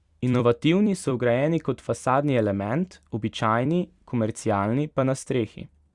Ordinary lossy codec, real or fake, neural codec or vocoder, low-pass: Opus, 64 kbps; fake; vocoder, 44.1 kHz, 128 mel bands every 256 samples, BigVGAN v2; 10.8 kHz